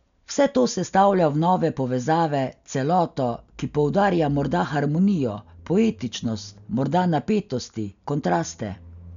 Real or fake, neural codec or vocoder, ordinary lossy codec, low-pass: real; none; none; 7.2 kHz